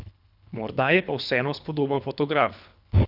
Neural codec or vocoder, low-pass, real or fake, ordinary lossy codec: codec, 24 kHz, 3 kbps, HILCodec; 5.4 kHz; fake; none